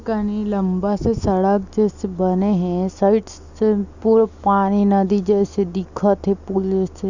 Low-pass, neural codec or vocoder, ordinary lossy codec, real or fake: 7.2 kHz; none; Opus, 64 kbps; real